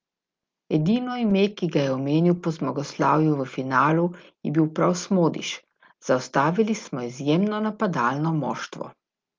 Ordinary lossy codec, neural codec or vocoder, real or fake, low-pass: Opus, 32 kbps; none; real; 7.2 kHz